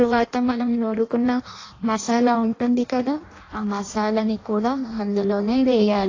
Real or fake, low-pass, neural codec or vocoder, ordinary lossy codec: fake; 7.2 kHz; codec, 16 kHz in and 24 kHz out, 0.6 kbps, FireRedTTS-2 codec; AAC, 32 kbps